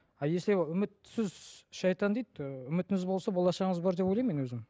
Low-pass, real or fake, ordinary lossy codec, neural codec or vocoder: none; real; none; none